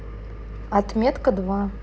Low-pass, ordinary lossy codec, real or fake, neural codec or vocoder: none; none; real; none